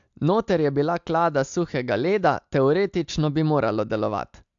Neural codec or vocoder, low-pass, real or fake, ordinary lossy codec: none; 7.2 kHz; real; AAC, 64 kbps